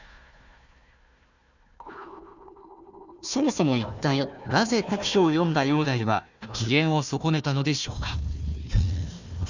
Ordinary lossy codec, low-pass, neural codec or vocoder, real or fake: none; 7.2 kHz; codec, 16 kHz, 1 kbps, FunCodec, trained on Chinese and English, 50 frames a second; fake